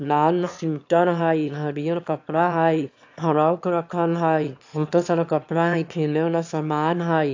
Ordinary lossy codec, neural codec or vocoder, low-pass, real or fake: AAC, 48 kbps; autoencoder, 22.05 kHz, a latent of 192 numbers a frame, VITS, trained on one speaker; 7.2 kHz; fake